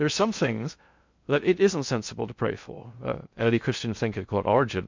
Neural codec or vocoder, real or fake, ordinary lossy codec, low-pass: codec, 16 kHz in and 24 kHz out, 0.6 kbps, FocalCodec, streaming, 2048 codes; fake; MP3, 64 kbps; 7.2 kHz